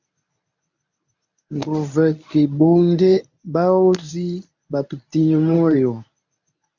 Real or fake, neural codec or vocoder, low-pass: fake; codec, 24 kHz, 0.9 kbps, WavTokenizer, medium speech release version 1; 7.2 kHz